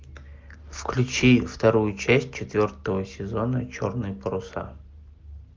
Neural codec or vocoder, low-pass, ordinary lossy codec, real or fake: none; 7.2 kHz; Opus, 32 kbps; real